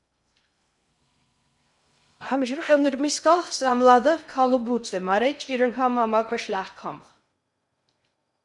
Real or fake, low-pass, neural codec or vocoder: fake; 10.8 kHz; codec, 16 kHz in and 24 kHz out, 0.6 kbps, FocalCodec, streaming, 2048 codes